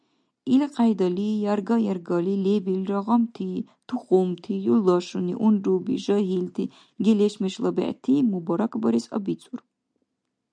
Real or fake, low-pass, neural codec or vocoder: real; 9.9 kHz; none